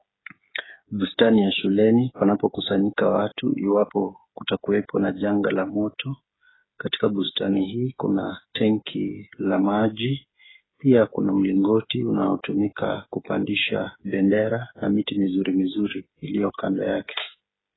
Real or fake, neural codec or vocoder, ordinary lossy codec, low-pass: fake; codec, 16 kHz, 8 kbps, FreqCodec, smaller model; AAC, 16 kbps; 7.2 kHz